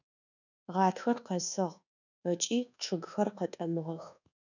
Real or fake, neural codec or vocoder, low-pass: fake; codec, 24 kHz, 1.2 kbps, DualCodec; 7.2 kHz